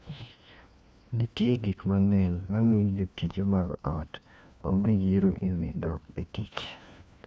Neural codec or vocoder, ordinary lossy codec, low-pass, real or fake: codec, 16 kHz, 1 kbps, FunCodec, trained on LibriTTS, 50 frames a second; none; none; fake